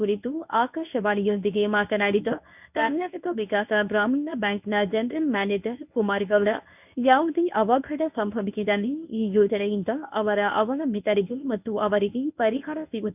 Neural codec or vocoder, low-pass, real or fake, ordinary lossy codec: codec, 24 kHz, 0.9 kbps, WavTokenizer, medium speech release version 1; 3.6 kHz; fake; none